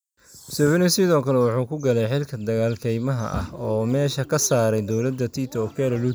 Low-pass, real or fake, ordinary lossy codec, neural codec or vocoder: none; real; none; none